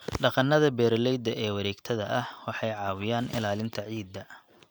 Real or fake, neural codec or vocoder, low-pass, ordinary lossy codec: real; none; none; none